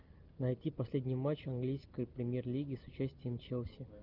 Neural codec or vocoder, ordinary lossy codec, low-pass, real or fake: none; Opus, 24 kbps; 5.4 kHz; real